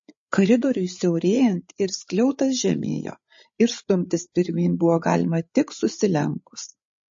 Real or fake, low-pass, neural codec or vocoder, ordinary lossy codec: fake; 7.2 kHz; codec, 16 kHz, 8 kbps, FreqCodec, larger model; MP3, 32 kbps